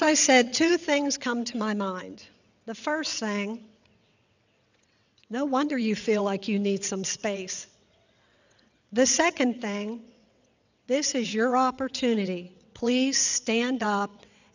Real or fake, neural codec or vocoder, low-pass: fake; vocoder, 22.05 kHz, 80 mel bands, WaveNeXt; 7.2 kHz